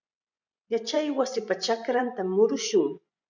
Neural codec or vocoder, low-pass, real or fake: vocoder, 24 kHz, 100 mel bands, Vocos; 7.2 kHz; fake